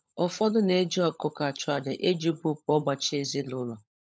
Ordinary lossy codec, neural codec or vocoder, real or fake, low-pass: none; codec, 16 kHz, 16 kbps, FunCodec, trained on LibriTTS, 50 frames a second; fake; none